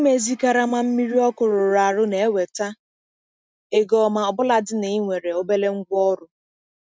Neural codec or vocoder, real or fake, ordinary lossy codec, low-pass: none; real; none; none